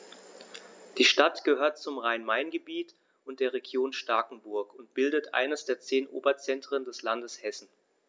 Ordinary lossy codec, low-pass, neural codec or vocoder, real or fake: none; none; none; real